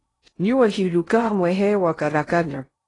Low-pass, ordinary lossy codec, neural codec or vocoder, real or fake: 10.8 kHz; AAC, 32 kbps; codec, 16 kHz in and 24 kHz out, 0.6 kbps, FocalCodec, streaming, 2048 codes; fake